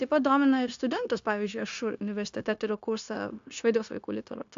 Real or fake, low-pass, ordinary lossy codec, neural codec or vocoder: fake; 7.2 kHz; AAC, 64 kbps; codec, 16 kHz, 0.9 kbps, LongCat-Audio-Codec